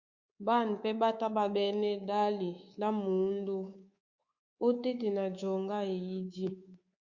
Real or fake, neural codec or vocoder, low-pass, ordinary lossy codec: fake; codec, 24 kHz, 3.1 kbps, DualCodec; 7.2 kHz; Opus, 64 kbps